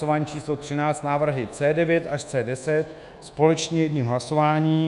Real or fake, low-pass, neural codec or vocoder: fake; 10.8 kHz; codec, 24 kHz, 1.2 kbps, DualCodec